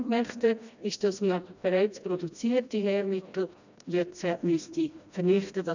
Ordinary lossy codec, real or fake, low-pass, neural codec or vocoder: none; fake; 7.2 kHz; codec, 16 kHz, 1 kbps, FreqCodec, smaller model